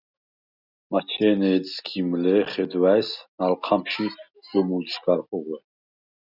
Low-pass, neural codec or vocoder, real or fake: 5.4 kHz; none; real